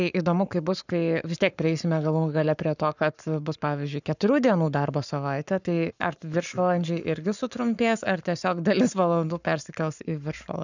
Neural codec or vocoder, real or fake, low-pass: codec, 44.1 kHz, 7.8 kbps, Pupu-Codec; fake; 7.2 kHz